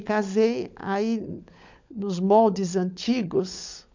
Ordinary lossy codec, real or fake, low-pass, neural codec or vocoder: MP3, 64 kbps; fake; 7.2 kHz; codec, 16 kHz, 16 kbps, FunCodec, trained on LibriTTS, 50 frames a second